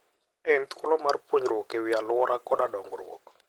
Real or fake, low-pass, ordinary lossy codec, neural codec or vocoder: real; 19.8 kHz; Opus, 16 kbps; none